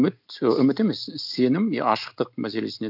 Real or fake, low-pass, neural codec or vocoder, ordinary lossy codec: real; 5.4 kHz; none; none